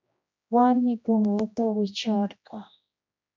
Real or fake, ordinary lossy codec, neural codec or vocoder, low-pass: fake; MP3, 64 kbps; codec, 16 kHz, 1 kbps, X-Codec, HuBERT features, trained on general audio; 7.2 kHz